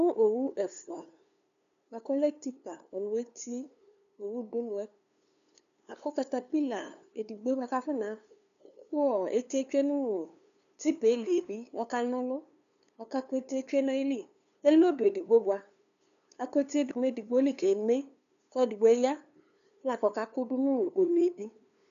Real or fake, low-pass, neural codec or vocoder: fake; 7.2 kHz; codec, 16 kHz, 2 kbps, FunCodec, trained on LibriTTS, 25 frames a second